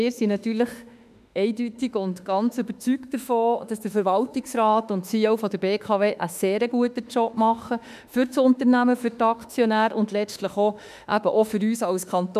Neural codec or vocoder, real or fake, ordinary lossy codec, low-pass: autoencoder, 48 kHz, 32 numbers a frame, DAC-VAE, trained on Japanese speech; fake; none; 14.4 kHz